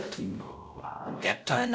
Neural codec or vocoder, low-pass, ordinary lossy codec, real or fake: codec, 16 kHz, 0.5 kbps, X-Codec, WavLM features, trained on Multilingual LibriSpeech; none; none; fake